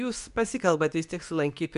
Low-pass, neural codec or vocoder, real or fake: 10.8 kHz; codec, 24 kHz, 0.9 kbps, WavTokenizer, medium speech release version 2; fake